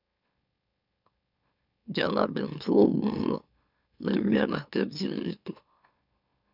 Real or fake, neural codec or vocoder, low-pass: fake; autoencoder, 44.1 kHz, a latent of 192 numbers a frame, MeloTTS; 5.4 kHz